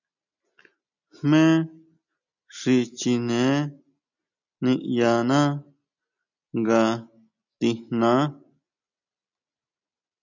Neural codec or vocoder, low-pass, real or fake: none; 7.2 kHz; real